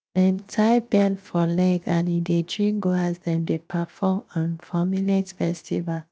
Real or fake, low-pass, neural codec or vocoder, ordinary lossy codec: fake; none; codec, 16 kHz, 0.7 kbps, FocalCodec; none